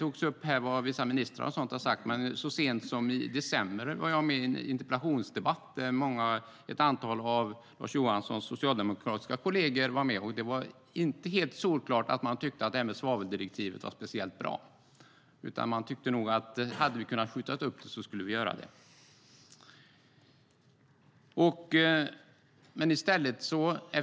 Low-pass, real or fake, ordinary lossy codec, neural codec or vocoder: none; real; none; none